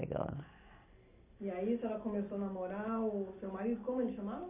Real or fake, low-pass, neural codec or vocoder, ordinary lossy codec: real; 3.6 kHz; none; MP3, 24 kbps